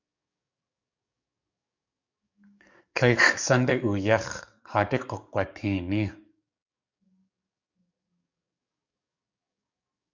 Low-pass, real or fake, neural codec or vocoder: 7.2 kHz; fake; codec, 44.1 kHz, 7.8 kbps, DAC